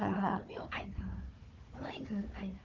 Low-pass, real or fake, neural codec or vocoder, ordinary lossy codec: 7.2 kHz; fake; codec, 16 kHz, 4 kbps, FunCodec, trained on Chinese and English, 50 frames a second; Opus, 32 kbps